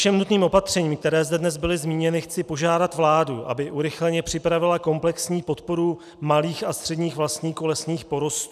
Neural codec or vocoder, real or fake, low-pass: none; real; 14.4 kHz